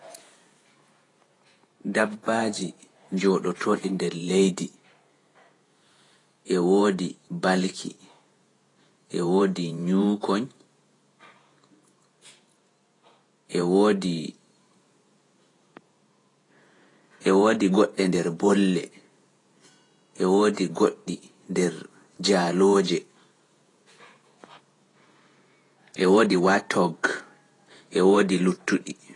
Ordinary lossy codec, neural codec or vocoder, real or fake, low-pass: AAC, 32 kbps; vocoder, 48 kHz, 128 mel bands, Vocos; fake; 10.8 kHz